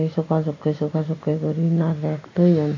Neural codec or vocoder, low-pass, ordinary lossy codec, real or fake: none; 7.2 kHz; AAC, 32 kbps; real